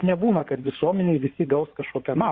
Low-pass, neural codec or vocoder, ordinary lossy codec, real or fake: 7.2 kHz; codec, 16 kHz in and 24 kHz out, 2.2 kbps, FireRedTTS-2 codec; Opus, 64 kbps; fake